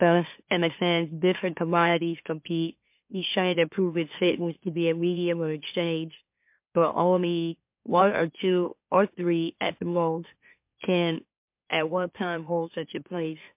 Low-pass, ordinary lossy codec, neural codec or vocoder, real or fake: 3.6 kHz; MP3, 32 kbps; autoencoder, 44.1 kHz, a latent of 192 numbers a frame, MeloTTS; fake